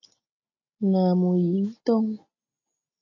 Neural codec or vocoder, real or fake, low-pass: none; real; 7.2 kHz